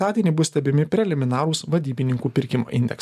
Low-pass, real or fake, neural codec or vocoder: 14.4 kHz; real; none